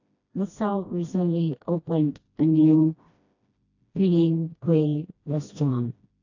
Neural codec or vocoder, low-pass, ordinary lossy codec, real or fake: codec, 16 kHz, 1 kbps, FreqCodec, smaller model; 7.2 kHz; AAC, 48 kbps; fake